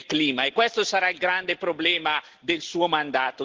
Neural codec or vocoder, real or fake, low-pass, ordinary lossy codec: none; real; 7.2 kHz; Opus, 16 kbps